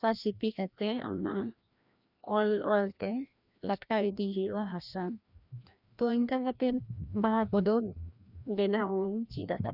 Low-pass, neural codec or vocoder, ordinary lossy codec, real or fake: 5.4 kHz; codec, 16 kHz, 1 kbps, FreqCodec, larger model; none; fake